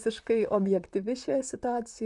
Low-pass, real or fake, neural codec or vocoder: 10.8 kHz; fake; vocoder, 44.1 kHz, 128 mel bands, Pupu-Vocoder